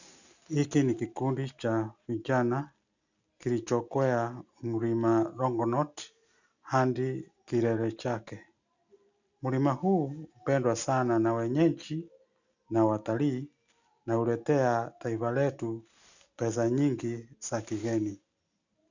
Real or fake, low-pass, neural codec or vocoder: real; 7.2 kHz; none